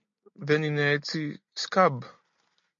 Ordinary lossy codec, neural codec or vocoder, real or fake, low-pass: MP3, 48 kbps; none; real; 7.2 kHz